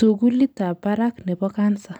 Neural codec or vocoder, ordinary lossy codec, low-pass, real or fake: none; none; none; real